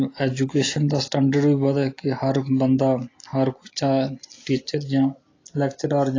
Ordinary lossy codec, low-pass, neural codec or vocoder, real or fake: AAC, 32 kbps; 7.2 kHz; none; real